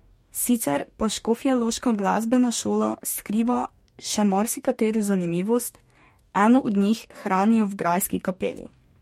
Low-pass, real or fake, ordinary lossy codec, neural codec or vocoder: 19.8 kHz; fake; MP3, 64 kbps; codec, 44.1 kHz, 2.6 kbps, DAC